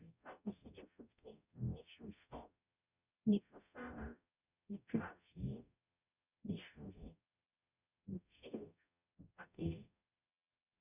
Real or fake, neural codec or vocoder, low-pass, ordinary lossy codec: fake; codec, 44.1 kHz, 0.9 kbps, DAC; 3.6 kHz; none